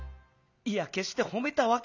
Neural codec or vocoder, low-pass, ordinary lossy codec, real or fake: none; 7.2 kHz; none; real